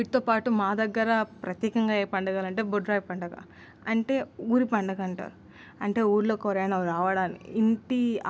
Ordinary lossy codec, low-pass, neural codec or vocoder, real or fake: none; none; none; real